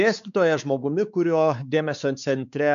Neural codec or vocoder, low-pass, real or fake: codec, 16 kHz, 4 kbps, X-Codec, WavLM features, trained on Multilingual LibriSpeech; 7.2 kHz; fake